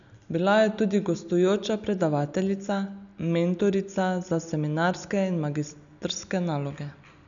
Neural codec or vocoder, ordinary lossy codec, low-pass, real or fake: none; none; 7.2 kHz; real